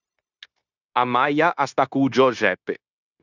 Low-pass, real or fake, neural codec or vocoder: 7.2 kHz; fake; codec, 16 kHz, 0.9 kbps, LongCat-Audio-Codec